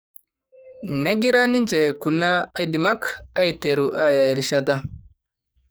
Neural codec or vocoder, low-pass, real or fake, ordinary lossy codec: codec, 44.1 kHz, 2.6 kbps, SNAC; none; fake; none